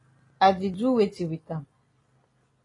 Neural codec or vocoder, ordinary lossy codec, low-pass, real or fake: none; AAC, 32 kbps; 10.8 kHz; real